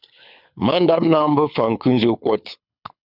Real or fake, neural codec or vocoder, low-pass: fake; codec, 24 kHz, 6 kbps, HILCodec; 5.4 kHz